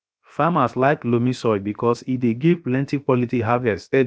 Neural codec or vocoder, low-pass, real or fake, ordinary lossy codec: codec, 16 kHz, 0.7 kbps, FocalCodec; none; fake; none